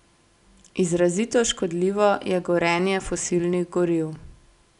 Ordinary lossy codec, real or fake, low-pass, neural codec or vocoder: none; real; 10.8 kHz; none